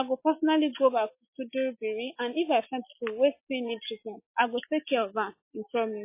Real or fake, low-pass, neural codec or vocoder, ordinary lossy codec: real; 3.6 kHz; none; MP3, 24 kbps